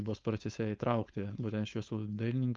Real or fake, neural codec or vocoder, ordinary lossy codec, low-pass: fake; codec, 16 kHz, 4.8 kbps, FACodec; Opus, 24 kbps; 7.2 kHz